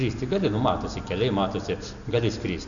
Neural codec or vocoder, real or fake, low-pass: none; real; 7.2 kHz